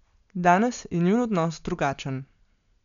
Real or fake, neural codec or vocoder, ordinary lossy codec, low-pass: real; none; MP3, 96 kbps; 7.2 kHz